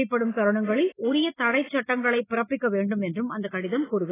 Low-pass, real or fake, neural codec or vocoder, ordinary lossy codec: 3.6 kHz; real; none; AAC, 16 kbps